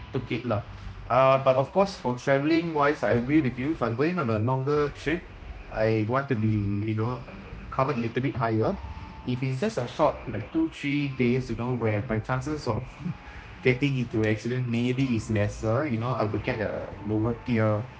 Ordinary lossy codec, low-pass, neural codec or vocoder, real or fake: none; none; codec, 16 kHz, 1 kbps, X-Codec, HuBERT features, trained on general audio; fake